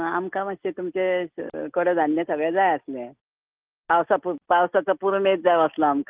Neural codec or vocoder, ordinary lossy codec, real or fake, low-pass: none; Opus, 32 kbps; real; 3.6 kHz